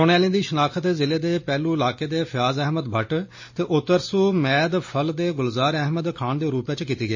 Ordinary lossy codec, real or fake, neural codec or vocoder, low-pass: MP3, 32 kbps; real; none; 7.2 kHz